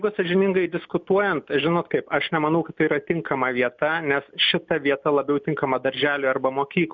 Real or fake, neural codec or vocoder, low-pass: real; none; 7.2 kHz